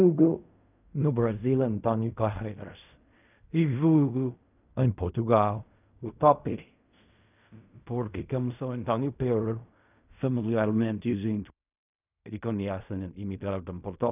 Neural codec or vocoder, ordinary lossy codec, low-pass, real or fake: codec, 16 kHz in and 24 kHz out, 0.4 kbps, LongCat-Audio-Codec, fine tuned four codebook decoder; none; 3.6 kHz; fake